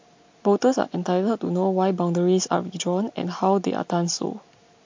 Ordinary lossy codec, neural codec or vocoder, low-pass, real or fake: MP3, 48 kbps; none; 7.2 kHz; real